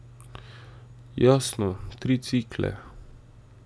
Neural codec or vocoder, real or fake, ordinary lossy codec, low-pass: none; real; none; none